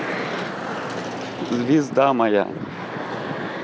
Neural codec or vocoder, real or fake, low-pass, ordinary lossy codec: none; real; none; none